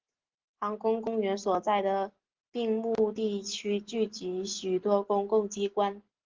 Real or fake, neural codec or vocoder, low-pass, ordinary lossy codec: real; none; 7.2 kHz; Opus, 16 kbps